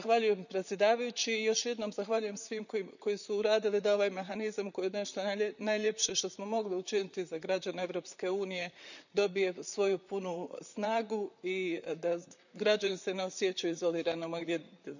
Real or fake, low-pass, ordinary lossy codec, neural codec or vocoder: fake; 7.2 kHz; none; vocoder, 44.1 kHz, 128 mel bands, Pupu-Vocoder